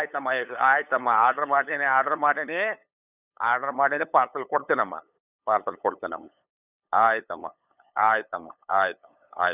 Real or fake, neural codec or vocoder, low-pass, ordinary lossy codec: fake; codec, 16 kHz, 8 kbps, FunCodec, trained on LibriTTS, 25 frames a second; 3.6 kHz; none